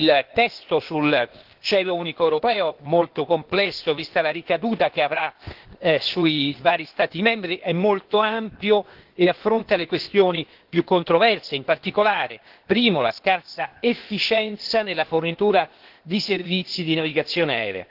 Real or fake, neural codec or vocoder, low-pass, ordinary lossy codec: fake; codec, 16 kHz, 0.8 kbps, ZipCodec; 5.4 kHz; Opus, 16 kbps